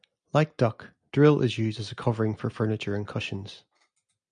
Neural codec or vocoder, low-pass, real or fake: none; 9.9 kHz; real